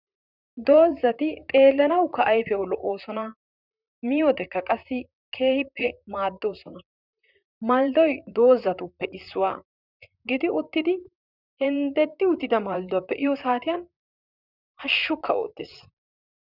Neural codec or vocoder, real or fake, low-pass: vocoder, 44.1 kHz, 128 mel bands, Pupu-Vocoder; fake; 5.4 kHz